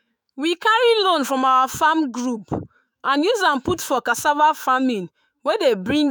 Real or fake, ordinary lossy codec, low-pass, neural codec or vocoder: fake; none; none; autoencoder, 48 kHz, 128 numbers a frame, DAC-VAE, trained on Japanese speech